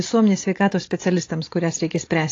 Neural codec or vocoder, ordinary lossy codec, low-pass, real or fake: none; AAC, 32 kbps; 7.2 kHz; real